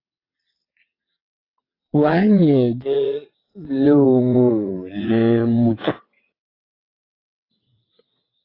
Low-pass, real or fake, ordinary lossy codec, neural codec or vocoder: 5.4 kHz; fake; AAC, 24 kbps; vocoder, 22.05 kHz, 80 mel bands, WaveNeXt